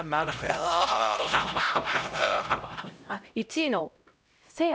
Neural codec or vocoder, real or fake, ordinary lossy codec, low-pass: codec, 16 kHz, 0.5 kbps, X-Codec, HuBERT features, trained on LibriSpeech; fake; none; none